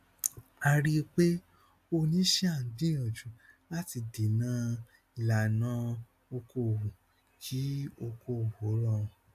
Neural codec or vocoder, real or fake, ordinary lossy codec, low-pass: none; real; AAC, 96 kbps; 14.4 kHz